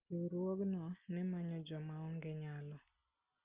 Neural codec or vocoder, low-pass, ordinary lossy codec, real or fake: none; 3.6 kHz; Opus, 32 kbps; real